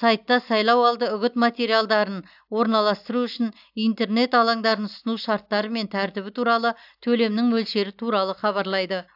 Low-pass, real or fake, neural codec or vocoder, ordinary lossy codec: 5.4 kHz; real; none; AAC, 48 kbps